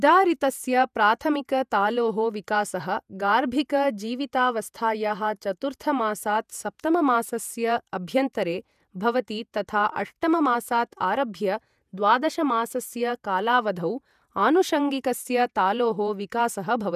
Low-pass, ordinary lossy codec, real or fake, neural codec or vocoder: 14.4 kHz; none; fake; vocoder, 48 kHz, 128 mel bands, Vocos